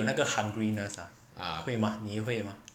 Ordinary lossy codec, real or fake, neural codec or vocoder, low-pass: none; fake; codec, 44.1 kHz, 7.8 kbps, DAC; 19.8 kHz